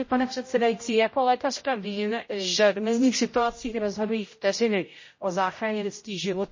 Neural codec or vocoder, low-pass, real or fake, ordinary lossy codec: codec, 16 kHz, 0.5 kbps, X-Codec, HuBERT features, trained on general audio; 7.2 kHz; fake; MP3, 32 kbps